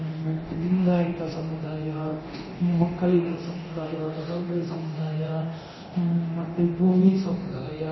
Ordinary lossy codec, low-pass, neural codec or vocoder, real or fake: MP3, 24 kbps; 7.2 kHz; codec, 24 kHz, 0.9 kbps, DualCodec; fake